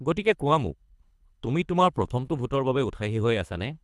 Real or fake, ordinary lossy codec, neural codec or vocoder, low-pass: fake; none; codec, 24 kHz, 3 kbps, HILCodec; none